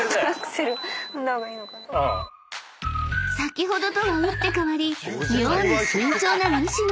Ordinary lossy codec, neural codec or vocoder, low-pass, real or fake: none; none; none; real